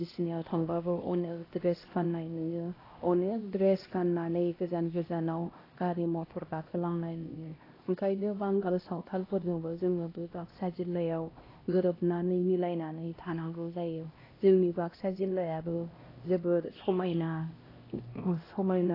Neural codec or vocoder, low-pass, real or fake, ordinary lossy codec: codec, 16 kHz, 1 kbps, X-Codec, HuBERT features, trained on LibriSpeech; 5.4 kHz; fake; AAC, 24 kbps